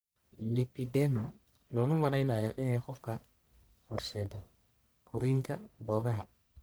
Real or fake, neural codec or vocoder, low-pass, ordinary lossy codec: fake; codec, 44.1 kHz, 1.7 kbps, Pupu-Codec; none; none